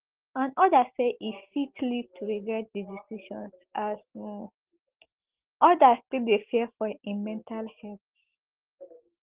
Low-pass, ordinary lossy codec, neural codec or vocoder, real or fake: 3.6 kHz; Opus, 16 kbps; none; real